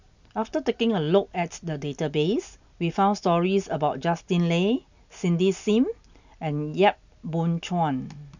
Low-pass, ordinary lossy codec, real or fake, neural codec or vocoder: 7.2 kHz; none; real; none